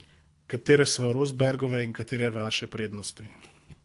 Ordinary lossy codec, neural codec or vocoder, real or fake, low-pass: AAC, 64 kbps; codec, 24 kHz, 3 kbps, HILCodec; fake; 10.8 kHz